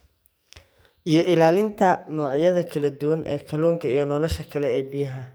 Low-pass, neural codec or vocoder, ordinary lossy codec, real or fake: none; codec, 44.1 kHz, 2.6 kbps, SNAC; none; fake